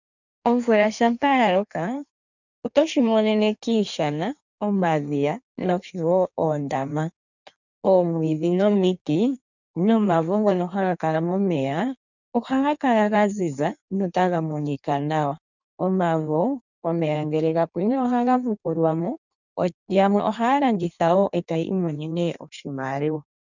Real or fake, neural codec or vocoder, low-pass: fake; codec, 16 kHz in and 24 kHz out, 1.1 kbps, FireRedTTS-2 codec; 7.2 kHz